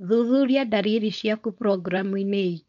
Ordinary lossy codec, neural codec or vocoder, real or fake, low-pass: none; codec, 16 kHz, 4.8 kbps, FACodec; fake; 7.2 kHz